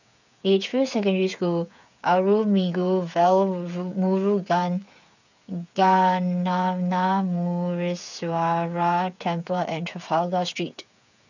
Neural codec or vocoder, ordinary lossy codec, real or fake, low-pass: codec, 16 kHz, 8 kbps, FreqCodec, smaller model; none; fake; 7.2 kHz